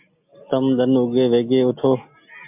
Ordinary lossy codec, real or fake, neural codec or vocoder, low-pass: MP3, 32 kbps; real; none; 3.6 kHz